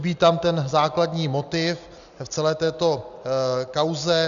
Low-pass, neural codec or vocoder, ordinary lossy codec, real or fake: 7.2 kHz; none; MP3, 96 kbps; real